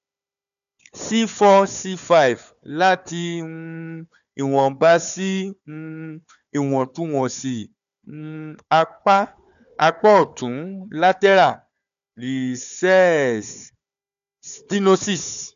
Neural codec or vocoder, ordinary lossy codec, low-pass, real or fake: codec, 16 kHz, 4 kbps, FunCodec, trained on Chinese and English, 50 frames a second; none; 7.2 kHz; fake